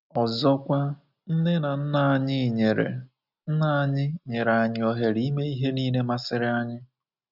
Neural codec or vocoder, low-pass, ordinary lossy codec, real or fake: none; 5.4 kHz; none; real